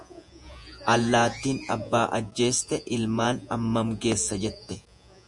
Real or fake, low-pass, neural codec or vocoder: fake; 10.8 kHz; vocoder, 48 kHz, 128 mel bands, Vocos